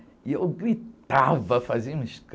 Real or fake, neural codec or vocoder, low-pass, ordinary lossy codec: real; none; none; none